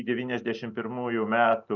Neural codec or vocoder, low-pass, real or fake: none; 7.2 kHz; real